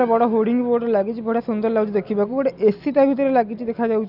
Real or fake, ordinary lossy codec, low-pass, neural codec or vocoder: real; none; 5.4 kHz; none